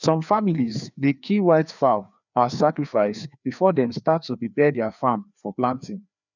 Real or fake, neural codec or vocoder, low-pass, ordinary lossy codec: fake; codec, 16 kHz, 2 kbps, FreqCodec, larger model; 7.2 kHz; none